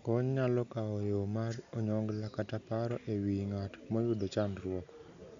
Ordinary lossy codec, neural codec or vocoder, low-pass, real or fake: MP3, 64 kbps; none; 7.2 kHz; real